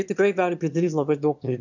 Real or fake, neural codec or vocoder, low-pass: fake; autoencoder, 22.05 kHz, a latent of 192 numbers a frame, VITS, trained on one speaker; 7.2 kHz